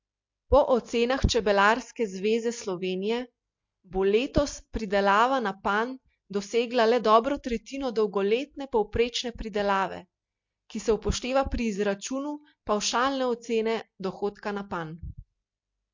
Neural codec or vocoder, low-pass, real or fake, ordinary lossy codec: none; 7.2 kHz; real; MP3, 48 kbps